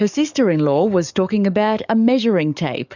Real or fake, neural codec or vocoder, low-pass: fake; codec, 44.1 kHz, 7.8 kbps, DAC; 7.2 kHz